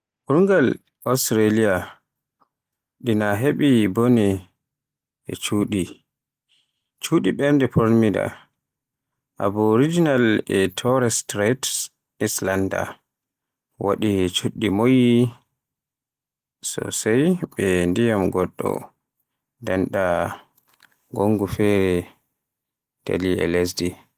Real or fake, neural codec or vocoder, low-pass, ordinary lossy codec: real; none; 14.4 kHz; Opus, 24 kbps